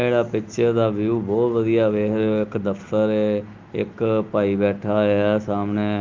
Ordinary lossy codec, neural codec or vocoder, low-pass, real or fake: Opus, 32 kbps; none; 7.2 kHz; real